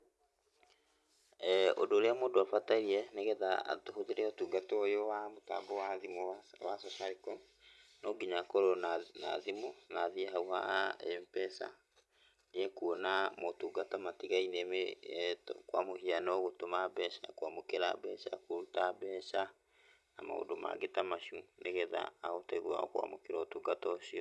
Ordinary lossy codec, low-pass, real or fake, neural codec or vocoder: none; none; real; none